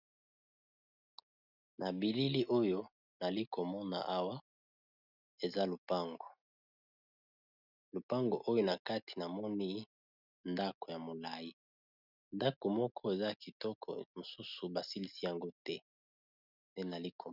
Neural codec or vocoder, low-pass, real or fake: none; 5.4 kHz; real